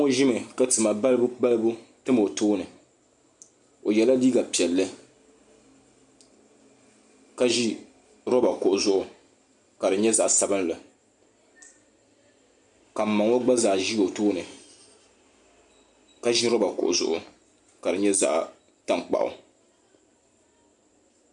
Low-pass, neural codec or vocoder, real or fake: 10.8 kHz; none; real